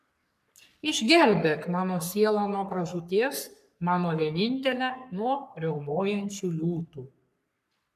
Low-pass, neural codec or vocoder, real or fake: 14.4 kHz; codec, 44.1 kHz, 3.4 kbps, Pupu-Codec; fake